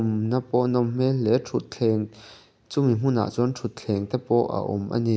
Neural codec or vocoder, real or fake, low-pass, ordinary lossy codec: none; real; none; none